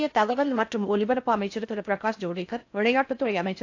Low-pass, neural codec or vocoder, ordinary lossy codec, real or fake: 7.2 kHz; codec, 16 kHz in and 24 kHz out, 0.6 kbps, FocalCodec, streaming, 4096 codes; AAC, 48 kbps; fake